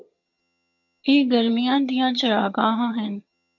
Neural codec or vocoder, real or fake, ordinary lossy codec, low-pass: vocoder, 22.05 kHz, 80 mel bands, HiFi-GAN; fake; MP3, 48 kbps; 7.2 kHz